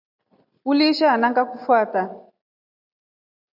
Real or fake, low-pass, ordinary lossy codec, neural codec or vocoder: real; 5.4 kHz; AAC, 48 kbps; none